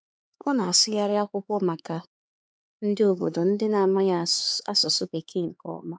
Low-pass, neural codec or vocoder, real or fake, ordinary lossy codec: none; codec, 16 kHz, 4 kbps, X-Codec, HuBERT features, trained on LibriSpeech; fake; none